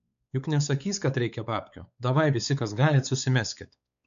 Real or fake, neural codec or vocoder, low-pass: fake; codec, 16 kHz, 4 kbps, X-Codec, WavLM features, trained on Multilingual LibriSpeech; 7.2 kHz